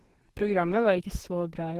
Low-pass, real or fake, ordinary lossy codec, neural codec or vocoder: 14.4 kHz; fake; Opus, 16 kbps; codec, 44.1 kHz, 2.6 kbps, SNAC